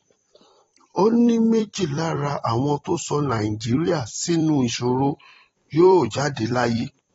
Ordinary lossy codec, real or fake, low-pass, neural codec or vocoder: AAC, 24 kbps; fake; 19.8 kHz; vocoder, 44.1 kHz, 128 mel bands every 256 samples, BigVGAN v2